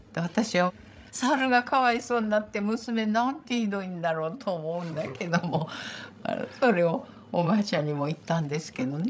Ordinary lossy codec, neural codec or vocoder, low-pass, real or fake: none; codec, 16 kHz, 16 kbps, FreqCodec, larger model; none; fake